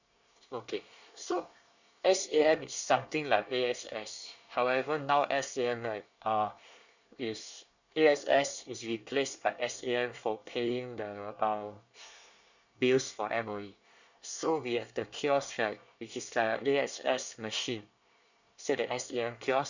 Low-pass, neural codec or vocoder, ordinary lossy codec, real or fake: 7.2 kHz; codec, 24 kHz, 1 kbps, SNAC; none; fake